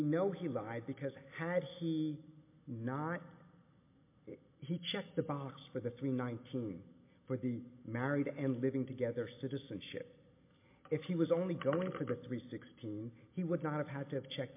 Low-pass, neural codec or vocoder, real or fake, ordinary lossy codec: 3.6 kHz; none; real; MP3, 24 kbps